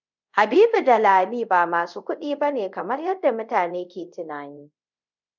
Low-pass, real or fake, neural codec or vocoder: 7.2 kHz; fake; codec, 24 kHz, 0.5 kbps, DualCodec